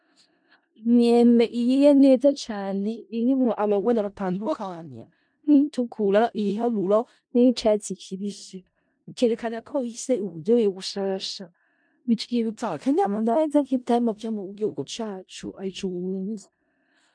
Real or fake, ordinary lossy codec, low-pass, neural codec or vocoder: fake; MP3, 64 kbps; 9.9 kHz; codec, 16 kHz in and 24 kHz out, 0.4 kbps, LongCat-Audio-Codec, four codebook decoder